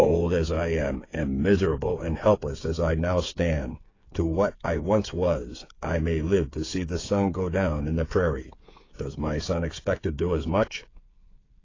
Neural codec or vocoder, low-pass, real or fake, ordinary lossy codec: codec, 16 kHz, 8 kbps, FreqCodec, smaller model; 7.2 kHz; fake; AAC, 32 kbps